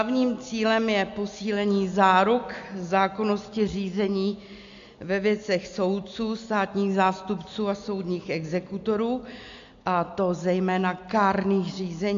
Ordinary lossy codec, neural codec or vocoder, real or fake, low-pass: AAC, 64 kbps; none; real; 7.2 kHz